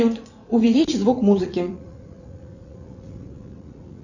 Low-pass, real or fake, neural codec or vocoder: 7.2 kHz; fake; vocoder, 44.1 kHz, 80 mel bands, Vocos